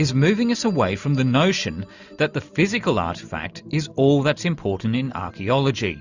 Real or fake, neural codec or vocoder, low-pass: real; none; 7.2 kHz